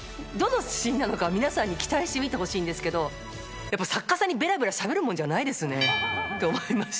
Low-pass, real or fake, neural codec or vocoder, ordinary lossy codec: none; real; none; none